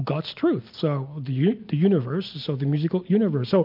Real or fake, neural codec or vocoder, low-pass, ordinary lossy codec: real; none; 5.4 kHz; MP3, 48 kbps